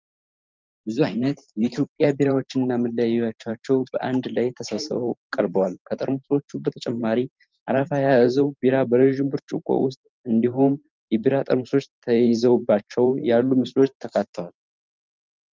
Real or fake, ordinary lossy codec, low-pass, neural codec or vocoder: fake; Opus, 32 kbps; 7.2 kHz; vocoder, 44.1 kHz, 128 mel bands every 512 samples, BigVGAN v2